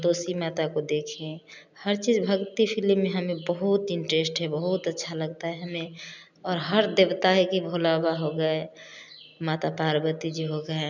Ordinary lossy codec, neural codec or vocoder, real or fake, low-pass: none; none; real; 7.2 kHz